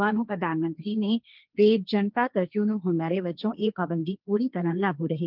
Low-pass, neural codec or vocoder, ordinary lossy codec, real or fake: 5.4 kHz; codec, 16 kHz, 1.1 kbps, Voila-Tokenizer; Opus, 24 kbps; fake